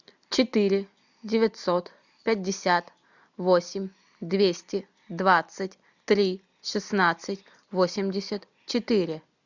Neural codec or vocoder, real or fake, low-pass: none; real; 7.2 kHz